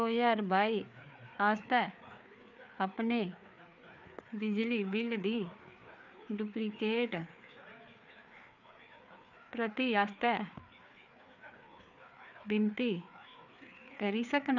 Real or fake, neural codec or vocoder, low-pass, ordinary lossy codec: fake; codec, 16 kHz, 4 kbps, FreqCodec, larger model; 7.2 kHz; none